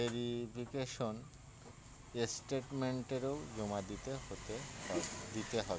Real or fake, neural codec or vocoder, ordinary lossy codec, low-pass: real; none; none; none